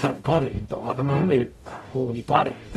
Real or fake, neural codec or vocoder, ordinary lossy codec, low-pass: fake; codec, 44.1 kHz, 0.9 kbps, DAC; AAC, 32 kbps; 19.8 kHz